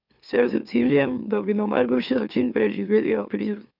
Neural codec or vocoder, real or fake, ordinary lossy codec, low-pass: autoencoder, 44.1 kHz, a latent of 192 numbers a frame, MeloTTS; fake; none; 5.4 kHz